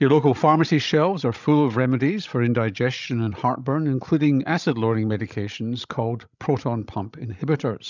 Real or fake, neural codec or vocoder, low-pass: fake; codec, 16 kHz, 16 kbps, FreqCodec, larger model; 7.2 kHz